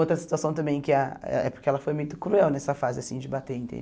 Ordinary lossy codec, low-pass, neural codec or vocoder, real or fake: none; none; none; real